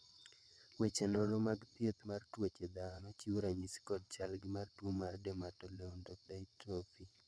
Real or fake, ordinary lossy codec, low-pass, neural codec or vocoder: fake; none; none; vocoder, 22.05 kHz, 80 mel bands, WaveNeXt